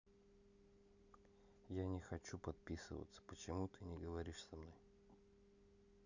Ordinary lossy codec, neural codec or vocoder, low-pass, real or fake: none; none; 7.2 kHz; real